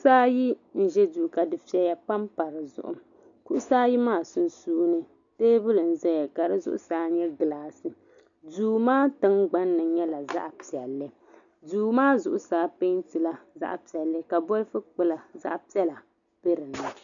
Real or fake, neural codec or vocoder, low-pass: real; none; 7.2 kHz